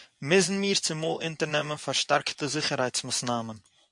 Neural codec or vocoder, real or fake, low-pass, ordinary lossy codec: vocoder, 24 kHz, 100 mel bands, Vocos; fake; 10.8 kHz; MP3, 64 kbps